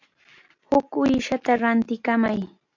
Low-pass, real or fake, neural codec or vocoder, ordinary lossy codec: 7.2 kHz; real; none; AAC, 48 kbps